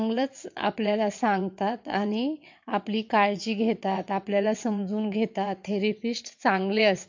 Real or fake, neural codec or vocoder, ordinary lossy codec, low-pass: fake; vocoder, 22.05 kHz, 80 mel bands, WaveNeXt; MP3, 48 kbps; 7.2 kHz